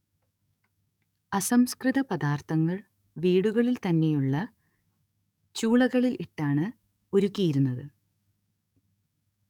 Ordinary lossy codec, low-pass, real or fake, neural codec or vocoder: none; 19.8 kHz; fake; codec, 44.1 kHz, 7.8 kbps, DAC